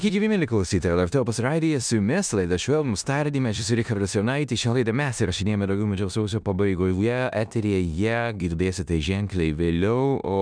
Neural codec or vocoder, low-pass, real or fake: codec, 16 kHz in and 24 kHz out, 0.9 kbps, LongCat-Audio-Codec, four codebook decoder; 9.9 kHz; fake